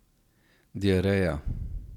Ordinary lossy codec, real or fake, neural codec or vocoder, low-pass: none; real; none; 19.8 kHz